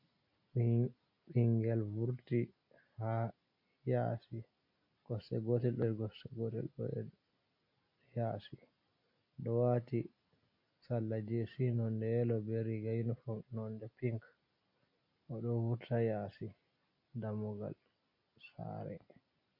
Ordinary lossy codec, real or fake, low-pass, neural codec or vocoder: MP3, 32 kbps; real; 5.4 kHz; none